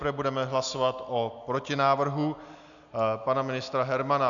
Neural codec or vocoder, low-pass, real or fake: none; 7.2 kHz; real